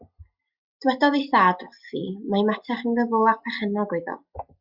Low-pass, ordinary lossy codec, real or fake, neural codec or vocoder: 5.4 kHz; Opus, 64 kbps; real; none